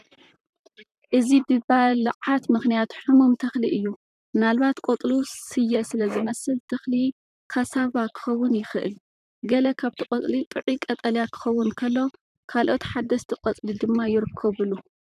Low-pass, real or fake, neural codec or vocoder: 14.4 kHz; fake; vocoder, 44.1 kHz, 128 mel bands, Pupu-Vocoder